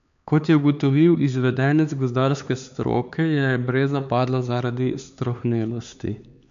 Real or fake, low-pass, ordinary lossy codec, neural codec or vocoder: fake; 7.2 kHz; MP3, 64 kbps; codec, 16 kHz, 4 kbps, X-Codec, HuBERT features, trained on LibriSpeech